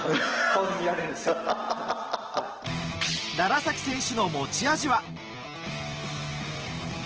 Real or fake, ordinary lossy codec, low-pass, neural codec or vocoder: real; Opus, 16 kbps; 7.2 kHz; none